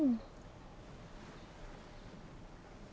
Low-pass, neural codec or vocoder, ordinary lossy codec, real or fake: none; none; none; real